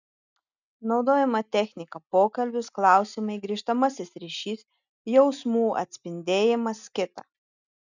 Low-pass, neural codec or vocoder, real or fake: 7.2 kHz; none; real